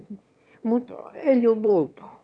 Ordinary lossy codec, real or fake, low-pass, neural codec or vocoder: none; fake; 9.9 kHz; autoencoder, 22.05 kHz, a latent of 192 numbers a frame, VITS, trained on one speaker